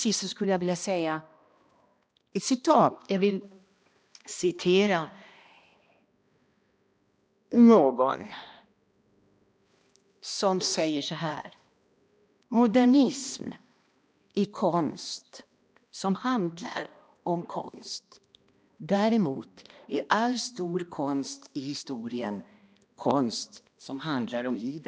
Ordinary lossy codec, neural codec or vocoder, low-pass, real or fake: none; codec, 16 kHz, 1 kbps, X-Codec, HuBERT features, trained on balanced general audio; none; fake